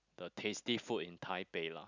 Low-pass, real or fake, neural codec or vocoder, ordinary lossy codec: 7.2 kHz; real; none; none